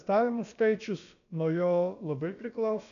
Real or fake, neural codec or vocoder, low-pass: fake; codec, 16 kHz, about 1 kbps, DyCAST, with the encoder's durations; 7.2 kHz